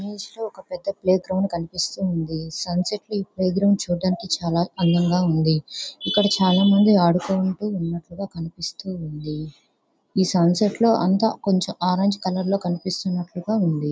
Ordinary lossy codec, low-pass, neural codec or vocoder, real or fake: none; none; none; real